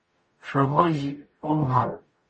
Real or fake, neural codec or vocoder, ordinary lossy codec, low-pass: fake; codec, 44.1 kHz, 0.9 kbps, DAC; MP3, 32 kbps; 10.8 kHz